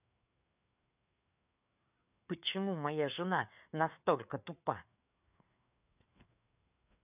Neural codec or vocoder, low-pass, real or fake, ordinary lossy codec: codec, 16 kHz, 4 kbps, FreqCodec, larger model; 3.6 kHz; fake; none